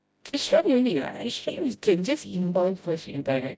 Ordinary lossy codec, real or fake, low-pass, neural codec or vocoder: none; fake; none; codec, 16 kHz, 0.5 kbps, FreqCodec, smaller model